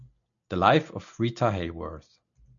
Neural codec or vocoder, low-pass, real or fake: none; 7.2 kHz; real